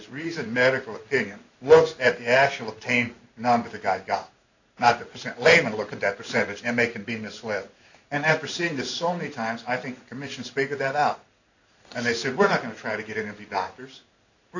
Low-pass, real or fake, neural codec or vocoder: 7.2 kHz; fake; codec, 16 kHz in and 24 kHz out, 1 kbps, XY-Tokenizer